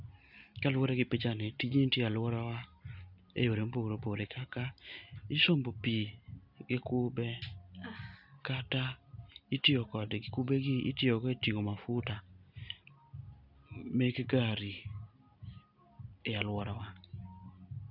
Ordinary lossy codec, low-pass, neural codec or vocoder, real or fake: none; 5.4 kHz; none; real